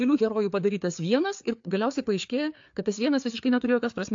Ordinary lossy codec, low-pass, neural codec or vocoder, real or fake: AAC, 64 kbps; 7.2 kHz; codec, 16 kHz, 4 kbps, FreqCodec, larger model; fake